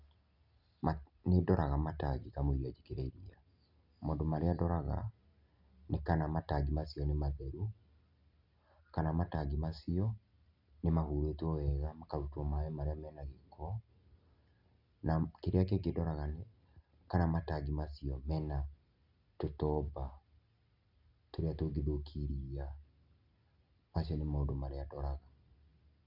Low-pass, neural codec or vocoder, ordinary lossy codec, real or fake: 5.4 kHz; none; none; real